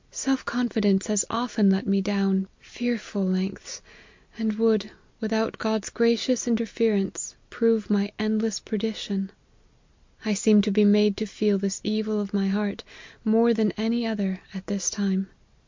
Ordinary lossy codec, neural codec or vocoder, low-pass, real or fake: MP3, 48 kbps; none; 7.2 kHz; real